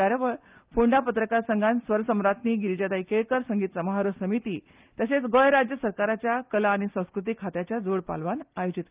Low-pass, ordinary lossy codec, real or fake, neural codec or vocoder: 3.6 kHz; Opus, 32 kbps; real; none